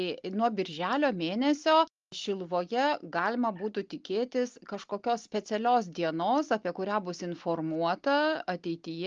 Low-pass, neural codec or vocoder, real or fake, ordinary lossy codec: 7.2 kHz; none; real; Opus, 32 kbps